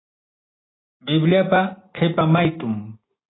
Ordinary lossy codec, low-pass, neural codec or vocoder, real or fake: AAC, 16 kbps; 7.2 kHz; none; real